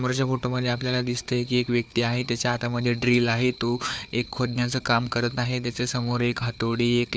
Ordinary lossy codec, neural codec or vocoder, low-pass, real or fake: none; codec, 16 kHz, 4 kbps, FunCodec, trained on Chinese and English, 50 frames a second; none; fake